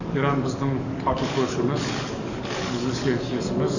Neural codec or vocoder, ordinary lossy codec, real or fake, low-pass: codec, 44.1 kHz, 7.8 kbps, DAC; none; fake; 7.2 kHz